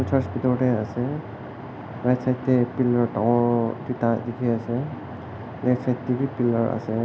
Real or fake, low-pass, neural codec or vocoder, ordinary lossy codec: real; none; none; none